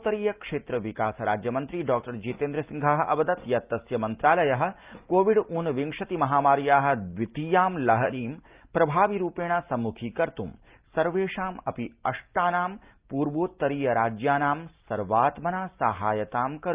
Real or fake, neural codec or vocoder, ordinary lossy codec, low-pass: real; none; Opus, 24 kbps; 3.6 kHz